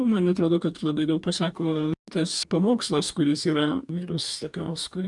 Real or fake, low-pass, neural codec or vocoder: fake; 10.8 kHz; codec, 44.1 kHz, 2.6 kbps, DAC